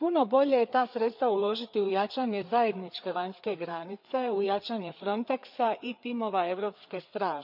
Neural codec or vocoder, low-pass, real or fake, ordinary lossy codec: codec, 16 kHz, 4 kbps, FreqCodec, larger model; 5.4 kHz; fake; none